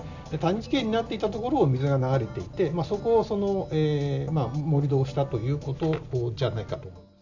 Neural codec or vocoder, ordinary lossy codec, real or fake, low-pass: none; none; real; 7.2 kHz